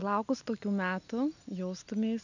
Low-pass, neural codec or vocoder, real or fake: 7.2 kHz; none; real